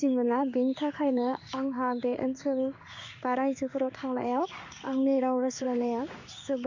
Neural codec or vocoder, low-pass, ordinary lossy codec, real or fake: codec, 16 kHz in and 24 kHz out, 1 kbps, XY-Tokenizer; 7.2 kHz; none; fake